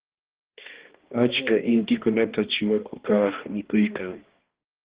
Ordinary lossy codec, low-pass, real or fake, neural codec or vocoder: Opus, 16 kbps; 3.6 kHz; fake; codec, 16 kHz, 1 kbps, X-Codec, HuBERT features, trained on balanced general audio